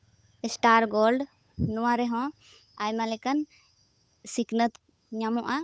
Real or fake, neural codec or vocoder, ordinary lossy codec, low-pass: fake; codec, 16 kHz, 8 kbps, FunCodec, trained on Chinese and English, 25 frames a second; none; none